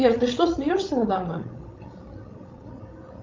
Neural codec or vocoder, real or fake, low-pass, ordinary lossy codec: codec, 16 kHz, 16 kbps, FreqCodec, larger model; fake; 7.2 kHz; Opus, 24 kbps